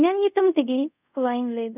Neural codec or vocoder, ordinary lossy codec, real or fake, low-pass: codec, 24 kHz, 0.5 kbps, DualCodec; none; fake; 3.6 kHz